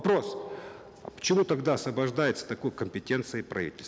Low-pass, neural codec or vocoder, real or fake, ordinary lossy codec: none; none; real; none